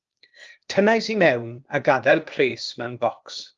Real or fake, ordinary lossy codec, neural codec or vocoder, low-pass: fake; Opus, 32 kbps; codec, 16 kHz, 0.8 kbps, ZipCodec; 7.2 kHz